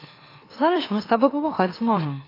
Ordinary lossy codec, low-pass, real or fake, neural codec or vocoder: AAC, 24 kbps; 5.4 kHz; fake; autoencoder, 44.1 kHz, a latent of 192 numbers a frame, MeloTTS